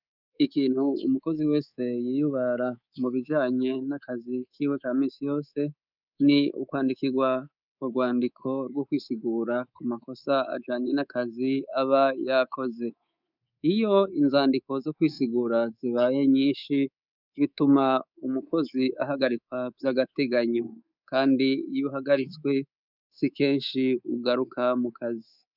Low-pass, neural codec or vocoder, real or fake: 5.4 kHz; codec, 24 kHz, 3.1 kbps, DualCodec; fake